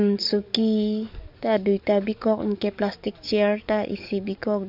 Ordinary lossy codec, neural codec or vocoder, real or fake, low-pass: AAC, 48 kbps; codec, 44.1 kHz, 7.8 kbps, DAC; fake; 5.4 kHz